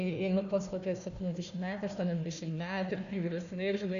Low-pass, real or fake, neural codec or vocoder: 7.2 kHz; fake; codec, 16 kHz, 1 kbps, FunCodec, trained on Chinese and English, 50 frames a second